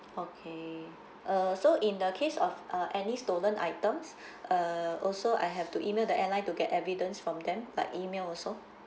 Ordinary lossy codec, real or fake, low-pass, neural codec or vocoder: none; real; none; none